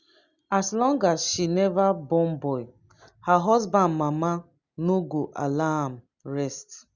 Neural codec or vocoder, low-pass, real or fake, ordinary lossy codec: none; 7.2 kHz; real; Opus, 64 kbps